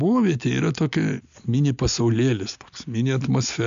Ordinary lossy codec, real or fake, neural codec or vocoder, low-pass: AAC, 64 kbps; real; none; 7.2 kHz